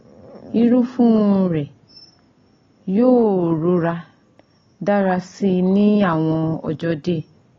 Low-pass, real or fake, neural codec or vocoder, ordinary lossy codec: 7.2 kHz; real; none; AAC, 32 kbps